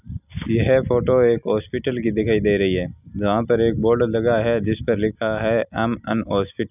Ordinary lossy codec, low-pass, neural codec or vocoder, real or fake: none; 3.6 kHz; none; real